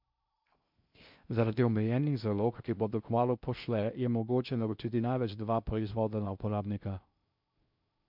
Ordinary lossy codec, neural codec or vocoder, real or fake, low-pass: MP3, 48 kbps; codec, 16 kHz in and 24 kHz out, 0.6 kbps, FocalCodec, streaming, 2048 codes; fake; 5.4 kHz